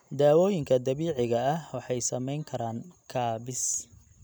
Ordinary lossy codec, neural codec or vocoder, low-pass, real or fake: none; none; none; real